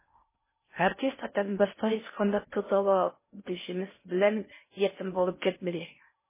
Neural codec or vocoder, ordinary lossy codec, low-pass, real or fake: codec, 16 kHz in and 24 kHz out, 0.6 kbps, FocalCodec, streaming, 4096 codes; MP3, 16 kbps; 3.6 kHz; fake